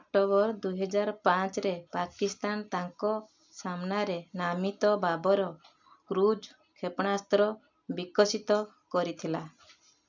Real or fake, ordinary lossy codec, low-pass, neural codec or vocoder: real; MP3, 64 kbps; 7.2 kHz; none